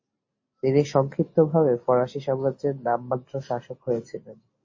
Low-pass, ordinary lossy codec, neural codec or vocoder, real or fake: 7.2 kHz; MP3, 32 kbps; none; real